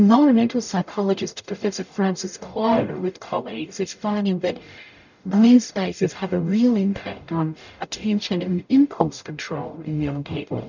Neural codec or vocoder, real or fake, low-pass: codec, 44.1 kHz, 0.9 kbps, DAC; fake; 7.2 kHz